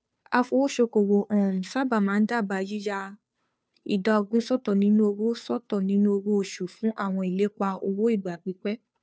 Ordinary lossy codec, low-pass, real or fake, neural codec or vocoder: none; none; fake; codec, 16 kHz, 2 kbps, FunCodec, trained on Chinese and English, 25 frames a second